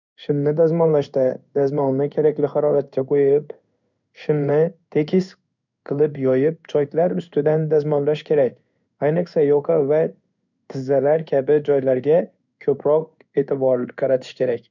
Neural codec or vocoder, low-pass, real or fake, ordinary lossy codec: codec, 16 kHz in and 24 kHz out, 1 kbps, XY-Tokenizer; 7.2 kHz; fake; none